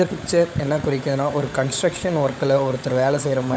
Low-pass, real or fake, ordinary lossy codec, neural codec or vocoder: none; fake; none; codec, 16 kHz, 16 kbps, FunCodec, trained on LibriTTS, 50 frames a second